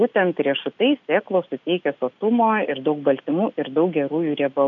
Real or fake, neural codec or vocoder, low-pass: real; none; 7.2 kHz